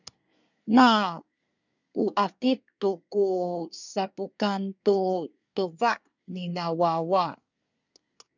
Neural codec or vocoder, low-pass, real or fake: codec, 24 kHz, 1 kbps, SNAC; 7.2 kHz; fake